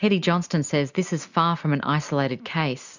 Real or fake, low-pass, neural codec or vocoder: real; 7.2 kHz; none